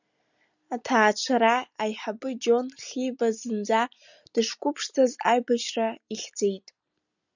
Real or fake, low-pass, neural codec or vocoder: real; 7.2 kHz; none